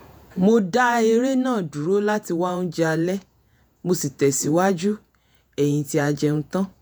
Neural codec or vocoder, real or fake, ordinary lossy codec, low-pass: vocoder, 48 kHz, 128 mel bands, Vocos; fake; none; none